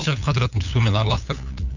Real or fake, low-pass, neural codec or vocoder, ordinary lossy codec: fake; 7.2 kHz; codec, 16 kHz, 8 kbps, FunCodec, trained on LibriTTS, 25 frames a second; none